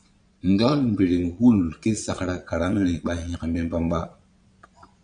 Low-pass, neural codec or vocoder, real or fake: 9.9 kHz; vocoder, 22.05 kHz, 80 mel bands, Vocos; fake